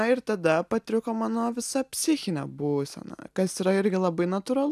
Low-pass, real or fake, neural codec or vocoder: 14.4 kHz; real; none